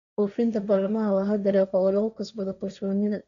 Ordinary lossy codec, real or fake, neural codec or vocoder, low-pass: none; fake; codec, 16 kHz, 1.1 kbps, Voila-Tokenizer; 7.2 kHz